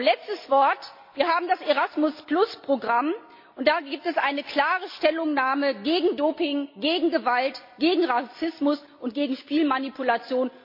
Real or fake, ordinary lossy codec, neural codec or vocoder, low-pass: real; MP3, 32 kbps; none; 5.4 kHz